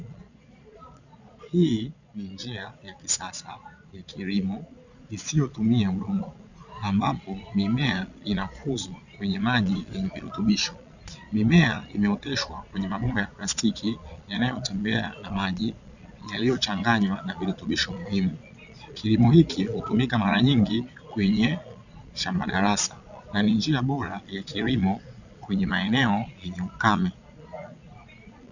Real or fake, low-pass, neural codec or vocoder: fake; 7.2 kHz; vocoder, 22.05 kHz, 80 mel bands, Vocos